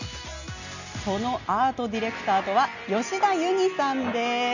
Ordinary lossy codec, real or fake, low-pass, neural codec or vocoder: none; real; 7.2 kHz; none